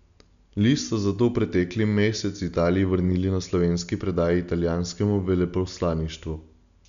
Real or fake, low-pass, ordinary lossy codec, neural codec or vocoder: real; 7.2 kHz; none; none